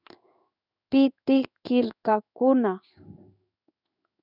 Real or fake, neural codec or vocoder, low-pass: fake; codec, 16 kHz in and 24 kHz out, 1 kbps, XY-Tokenizer; 5.4 kHz